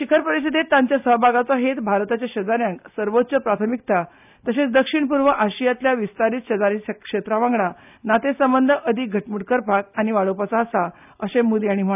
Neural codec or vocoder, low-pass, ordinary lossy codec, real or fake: none; 3.6 kHz; none; real